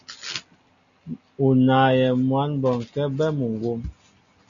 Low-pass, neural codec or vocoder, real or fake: 7.2 kHz; none; real